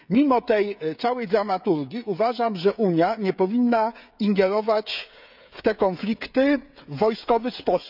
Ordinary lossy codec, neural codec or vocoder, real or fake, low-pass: none; codec, 16 kHz, 16 kbps, FreqCodec, smaller model; fake; 5.4 kHz